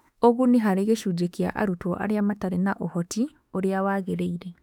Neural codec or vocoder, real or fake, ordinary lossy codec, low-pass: autoencoder, 48 kHz, 32 numbers a frame, DAC-VAE, trained on Japanese speech; fake; none; 19.8 kHz